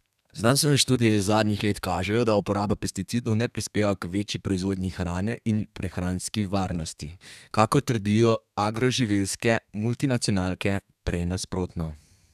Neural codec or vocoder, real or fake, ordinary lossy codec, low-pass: codec, 32 kHz, 1.9 kbps, SNAC; fake; none; 14.4 kHz